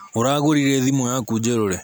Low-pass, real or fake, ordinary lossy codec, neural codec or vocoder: none; real; none; none